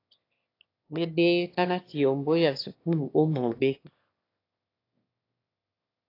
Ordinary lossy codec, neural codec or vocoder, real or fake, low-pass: AAC, 32 kbps; autoencoder, 22.05 kHz, a latent of 192 numbers a frame, VITS, trained on one speaker; fake; 5.4 kHz